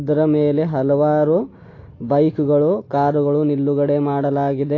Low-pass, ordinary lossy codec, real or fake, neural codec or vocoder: 7.2 kHz; AAC, 32 kbps; real; none